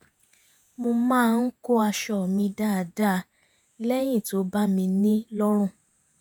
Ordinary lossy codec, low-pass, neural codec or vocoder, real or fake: none; none; vocoder, 48 kHz, 128 mel bands, Vocos; fake